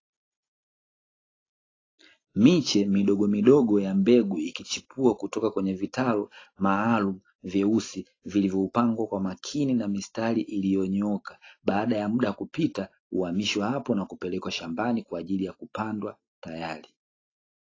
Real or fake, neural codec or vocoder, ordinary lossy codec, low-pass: real; none; AAC, 32 kbps; 7.2 kHz